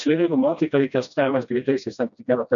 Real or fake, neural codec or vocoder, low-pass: fake; codec, 16 kHz, 1 kbps, FreqCodec, smaller model; 7.2 kHz